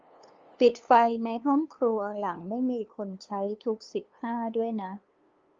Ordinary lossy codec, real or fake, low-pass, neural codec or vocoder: Opus, 32 kbps; fake; 7.2 kHz; codec, 16 kHz, 2 kbps, FunCodec, trained on LibriTTS, 25 frames a second